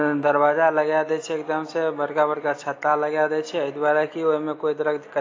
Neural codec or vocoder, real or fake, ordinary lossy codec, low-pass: none; real; AAC, 32 kbps; 7.2 kHz